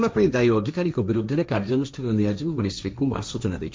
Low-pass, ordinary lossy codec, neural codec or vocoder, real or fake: none; none; codec, 16 kHz, 1.1 kbps, Voila-Tokenizer; fake